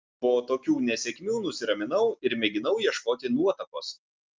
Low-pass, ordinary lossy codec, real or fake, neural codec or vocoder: 7.2 kHz; Opus, 24 kbps; real; none